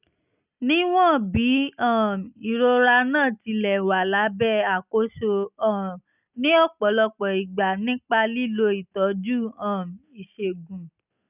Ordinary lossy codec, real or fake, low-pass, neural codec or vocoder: none; real; 3.6 kHz; none